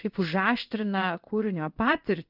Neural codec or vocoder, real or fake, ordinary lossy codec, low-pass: codec, 16 kHz in and 24 kHz out, 1 kbps, XY-Tokenizer; fake; Opus, 32 kbps; 5.4 kHz